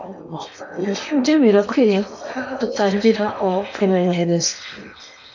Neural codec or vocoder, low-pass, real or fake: codec, 16 kHz in and 24 kHz out, 0.8 kbps, FocalCodec, streaming, 65536 codes; 7.2 kHz; fake